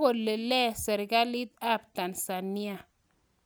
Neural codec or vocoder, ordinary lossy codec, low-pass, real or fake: none; none; none; real